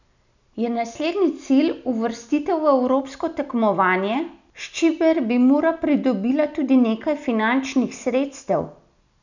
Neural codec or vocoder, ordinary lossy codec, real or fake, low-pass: none; none; real; 7.2 kHz